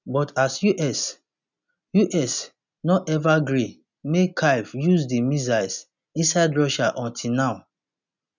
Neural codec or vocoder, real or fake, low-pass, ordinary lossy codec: none; real; 7.2 kHz; none